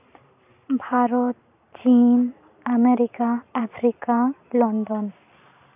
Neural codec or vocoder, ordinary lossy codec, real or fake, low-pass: vocoder, 44.1 kHz, 128 mel bands every 512 samples, BigVGAN v2; AAC, 32 kbps; fake; 3.6 kHz